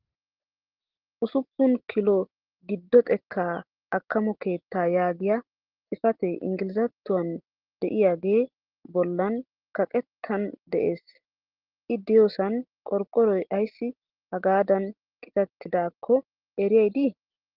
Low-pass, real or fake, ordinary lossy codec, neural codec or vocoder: 5.4 kHz; real; Opus, 16 kbps; none